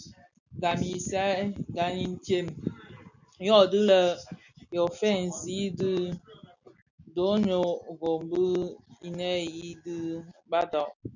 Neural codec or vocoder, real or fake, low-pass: none; real; 7.2 kHz